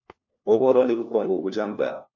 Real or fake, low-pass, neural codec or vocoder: fake; 7.2 kHz; codec, 16 kHz, 1 kbps, FunCodec, trained on LibriTTS, 50 frames a second